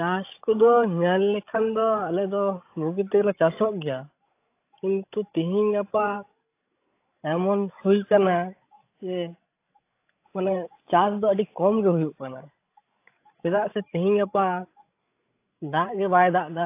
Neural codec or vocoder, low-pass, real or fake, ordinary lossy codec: codec, 16 kHz, 16 kbps, FreqCodec, larger model; 3.6 kHz; fake; AAC, 24 kbps